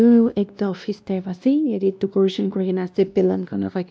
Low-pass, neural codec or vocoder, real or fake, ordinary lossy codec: none; codec, 16 kHz, 1 kbps, X-Codec, WavLM features, trained on Multilingual LibriSpeech; fake; none